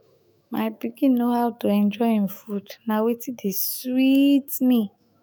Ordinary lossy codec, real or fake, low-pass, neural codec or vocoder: none; fake; none; autoencoder, 48 kHz, 128 numbers a frame, DAC-VAE, trained on Japanese speech